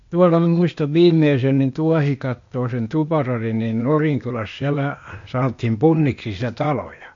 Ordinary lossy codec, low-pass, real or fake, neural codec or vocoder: MP3, 48 kbps; 7.2 kHz; fake; codec, 16 kHz, 0.8 kbps, ZipCodec